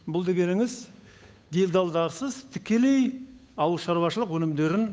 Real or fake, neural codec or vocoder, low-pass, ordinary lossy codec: fake; codec, 16 kHz, 8 kbps, FunCodec, trained on Chinese and English, 25 frames a second; none; none